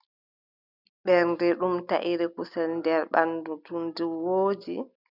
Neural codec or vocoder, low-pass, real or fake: none; 5.4 kHz; real